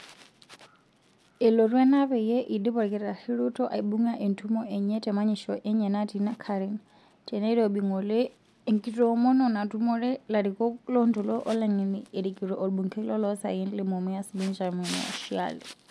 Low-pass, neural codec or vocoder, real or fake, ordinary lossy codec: none; none; real; none